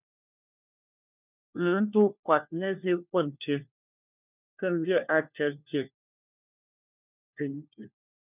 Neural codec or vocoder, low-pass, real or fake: codec, 16 kHz, 1 kbps, FunCodec, trained on LibriTTS, 50 frames a second; 3.6 kHz; fake